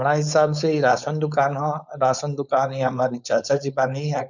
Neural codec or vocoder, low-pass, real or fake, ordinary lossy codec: codec, 16 kHz, 4.8 kbps, FACodec; 7.2 kHz; fake; none